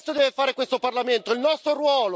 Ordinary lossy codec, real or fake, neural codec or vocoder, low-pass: none; real; none; none